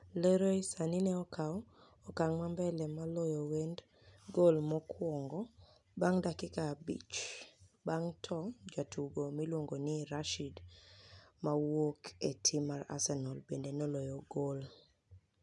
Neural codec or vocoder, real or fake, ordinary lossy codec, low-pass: none; real; none; 10.8 kHz